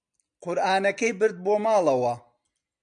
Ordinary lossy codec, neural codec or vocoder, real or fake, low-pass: MP3, 96 kbps; none; real; 9.9 kHz